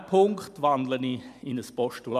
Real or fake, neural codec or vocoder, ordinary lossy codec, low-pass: real; none; MP3, 96 kbps; 14.4 kHz